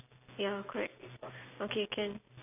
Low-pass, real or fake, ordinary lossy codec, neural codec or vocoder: 3.6 kHz; real; none; none